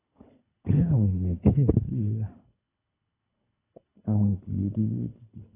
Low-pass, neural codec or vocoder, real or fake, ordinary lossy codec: 3.6 kHz; codec, 24 kHz, 3 kbps, HILCodec; fake; AAC, 16 kbps